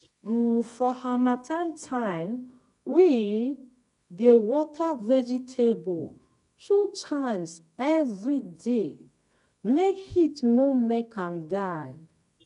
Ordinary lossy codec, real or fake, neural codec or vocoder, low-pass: none; fake; codec, 24 kHz, 0.9 kbps, WavTokenizer, medium music audio release; 10.8 kHz